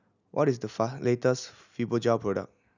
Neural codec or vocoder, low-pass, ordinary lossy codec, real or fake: none; 7.2 kHz; none; real